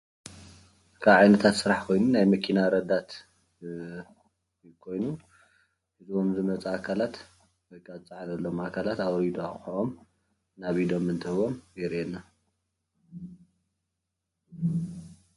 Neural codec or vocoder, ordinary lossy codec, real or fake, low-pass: none; MP3, 48 kbps; real; 14.4 kHz